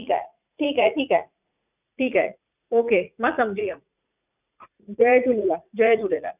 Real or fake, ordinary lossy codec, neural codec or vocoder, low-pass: fake; none; vocoder, 44.1 kHz, 80 mel bands, Vocos; 3.6 kHz